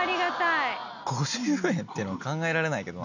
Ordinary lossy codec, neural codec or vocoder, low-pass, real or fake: none; none; 7.2 kHz; real